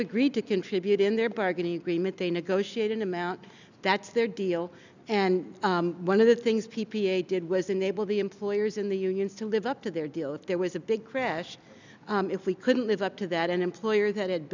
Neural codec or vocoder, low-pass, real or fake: none; 7.2 kHz; real